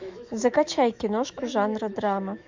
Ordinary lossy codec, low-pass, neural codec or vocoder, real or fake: MP3, 64 kbps; 7.2 kHz; autoencoder, 48 kHz, 128 numbers a frame, DAC-VAE, trained on Japanese speech; fake